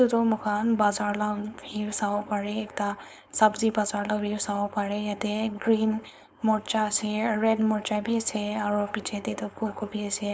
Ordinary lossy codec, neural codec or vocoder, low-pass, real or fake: none; codec, 16 kHz, 4.8 kbps, FACodec; none; fake